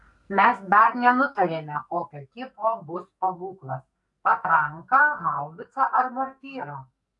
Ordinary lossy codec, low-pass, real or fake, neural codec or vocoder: MP3, 96 kbps; 10.8 kHz; fake; codec, 44.1 kHz, 2.6 kbps, SNAC